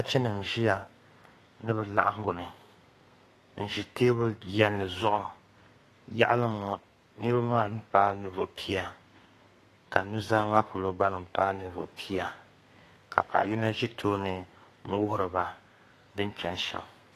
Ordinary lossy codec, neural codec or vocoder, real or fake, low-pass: AAC, 48 kbps; codec, 32 kHz, 1.9 kbps, SNAC; fake; 14.4 kHz